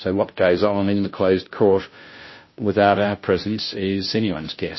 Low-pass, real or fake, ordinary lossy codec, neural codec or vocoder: 7.2 kHz; fake; MP3, 24 kbps; codec, 16 kHz, 0.5 kbps, FunCodec, trained on Chinese and English, 25 frames a second